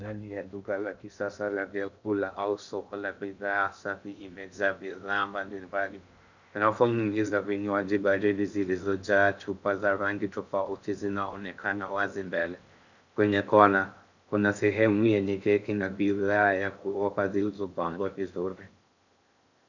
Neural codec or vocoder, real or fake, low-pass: codec, 16 kHz in and 24 kHz out, 0.6 kbps, FocalCodec, streaming, 2048 codes; fake; 7.2 kHz